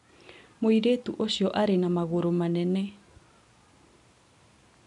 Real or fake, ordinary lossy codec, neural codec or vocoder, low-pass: real; MP3, 96 kbps; none; 10.8 kHz